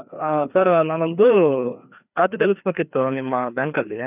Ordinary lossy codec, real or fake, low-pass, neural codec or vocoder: none; fake; 3.6 kHz; codec, 16 kHz, 2 kbps, FreqCodec, larger model